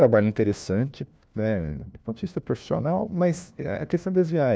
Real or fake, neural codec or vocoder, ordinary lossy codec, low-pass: fake; codec, 16 kHz, 1 kbps, FunCodec, trained on LibriTTS, 50 frames a second; none; none